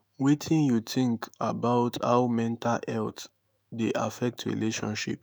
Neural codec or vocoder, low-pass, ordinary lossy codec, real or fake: autoencoder, 48 kHz, 128 numbers a frame, DAC-VAE, trained on Japanese speech; none; none; fake